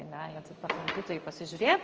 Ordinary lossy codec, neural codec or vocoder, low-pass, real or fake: Opus, 24 kbps; codec, 16 kHz in and 24 kHz out, 1 kbps, XY-Tokenizer; 7.2 kHz; fake